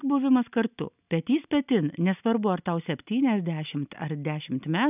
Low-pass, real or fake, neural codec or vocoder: 3.6 kHz; real; none